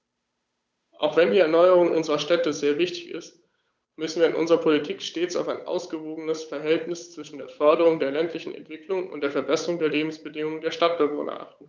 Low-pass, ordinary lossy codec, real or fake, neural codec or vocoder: none; none; fake; codec, 16 kHz, 8 kbps, FunCodec, trained on Chinese and English, 25 frames a second